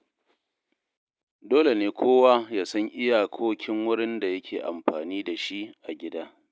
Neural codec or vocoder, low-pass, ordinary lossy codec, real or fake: none; none; none; real